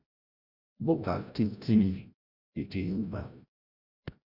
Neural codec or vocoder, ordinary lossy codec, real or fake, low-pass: codec, 16 kHz, 0.5 kbps, FreqCodec, larger model; Opus, 64 kbps; fake; 5.4 kHz